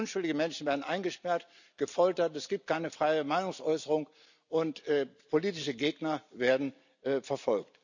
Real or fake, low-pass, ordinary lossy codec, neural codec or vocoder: real; 7.2 kHz; none; none